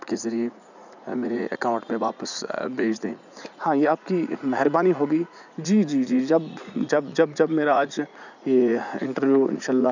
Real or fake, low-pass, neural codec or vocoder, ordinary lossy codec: fake; 7.2 kHz; vocoder, 44.1 kHz, 80 mel bands, Vocos; none